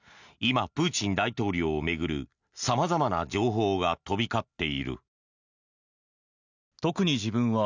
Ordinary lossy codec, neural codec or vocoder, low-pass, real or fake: none; none; 7.2 kHz; real